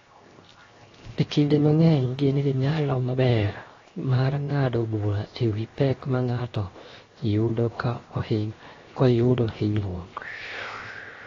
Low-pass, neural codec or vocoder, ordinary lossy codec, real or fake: 7.2 kHz; codec, 16 kHz, 0.7 kbps, FocalCodec; AAC, 32 kbps; fake